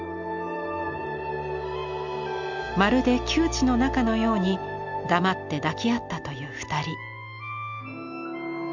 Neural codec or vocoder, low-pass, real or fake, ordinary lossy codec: none; 7.2 kHz; real; none